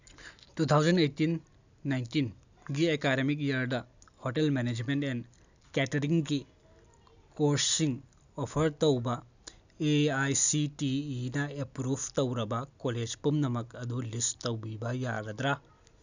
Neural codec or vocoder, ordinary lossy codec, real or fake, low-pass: none; none; real; 7.2 kHz